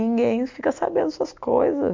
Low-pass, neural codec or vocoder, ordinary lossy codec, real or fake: 7.2 kHz; none; MP3, 48 kbps; real